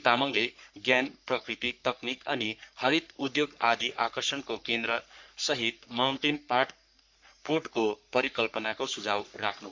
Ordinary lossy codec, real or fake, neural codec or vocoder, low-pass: MP3, 64 kbps; fake; codec, 44.1 kHz, 3.4 kbps, Pupu-Codec; 7.2 kHz